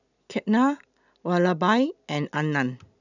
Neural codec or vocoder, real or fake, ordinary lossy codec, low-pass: none; real; none; 7.2 kHz